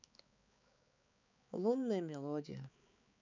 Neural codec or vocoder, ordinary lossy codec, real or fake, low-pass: codec, 16 kHz, 4 kbps, X-Codec, HuBERT features, trained on balanced general audio; MP3, 64 kbps; fake; 7.2 kHz